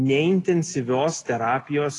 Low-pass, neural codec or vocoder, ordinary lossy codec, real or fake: 10.8 kHz; none; AAC, 32 kbps; real